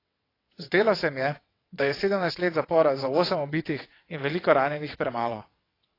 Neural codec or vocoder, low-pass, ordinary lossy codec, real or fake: vocoder, 22.05 kHz, 80 mel bands, WaveNeXt; 5.4 kHz; AAC, 24 kbps; fake